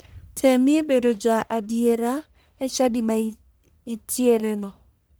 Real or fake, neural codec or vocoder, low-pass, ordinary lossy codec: fake; codec, 44.1 kHz, 1.7 kbps, Pupu-Codec; none; none